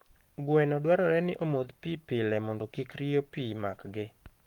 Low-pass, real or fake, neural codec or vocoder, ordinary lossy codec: 19.8 kHz; fake; codec, 44.1 kHz, 7.8 kbps, Pupu-Codec; Opus, 32 kbps